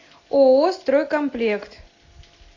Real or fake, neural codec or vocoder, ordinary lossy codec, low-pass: real; none; AAC, 32 kbps; 7.2 kHz